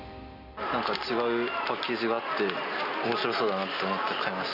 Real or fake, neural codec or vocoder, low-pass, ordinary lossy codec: real; none; 5.4 kHz; none